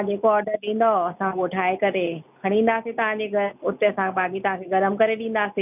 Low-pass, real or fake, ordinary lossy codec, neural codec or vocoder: 3.6 kHz; real; none; none